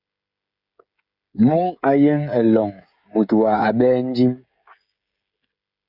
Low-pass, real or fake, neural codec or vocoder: 5.4 kHz; fake; codec, 16 kHz, 16 kbps, FreqCodec, smaller model